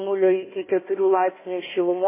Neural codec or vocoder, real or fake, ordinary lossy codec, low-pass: codec, 16 kHz, 1 kbps, FunCodec, trained on Chinese and English, 50 frames a second; fake; MP3, 16 kbps; 3.6 kHz